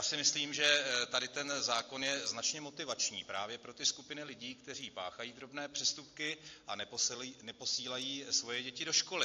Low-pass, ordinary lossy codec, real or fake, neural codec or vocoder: 7.2 kHz; AAC, 48 kbps; real; none